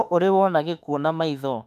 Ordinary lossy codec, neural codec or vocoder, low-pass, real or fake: none; autoencoder, 48 kHz, 32 numbers a frame, DAC-VAE, trained on Japanese speech; 14.4 kHz; fake